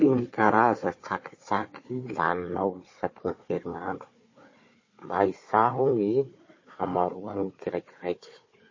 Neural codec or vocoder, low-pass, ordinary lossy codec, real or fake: codec, 16 kHz, 4 kbps, FunCodec, trained on Chinese and English, 50 frames a second; 7.2 kHz; MP3, 32 kbps; fake